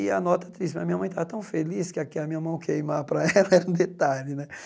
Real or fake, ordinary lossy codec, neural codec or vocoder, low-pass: real; none; none; none